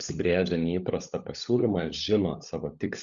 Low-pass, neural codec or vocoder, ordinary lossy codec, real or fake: 7.2 kHz; codec, 16 kHz, 16 kbps, FunCodec, trained on LibriTTS, 50 frames a second; Opus, 64 kbps; fake